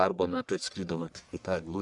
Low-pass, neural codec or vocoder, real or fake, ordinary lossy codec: 10.8 kHz; codec, 44.1 kHz, 1.7 kbps, Pupu-Codec; fake; Opus, 64 kbps